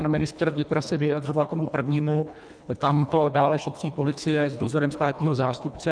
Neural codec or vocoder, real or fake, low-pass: codec, 24 kHz, 1.5 kbps, HILCodec; fake; 9.9 kHz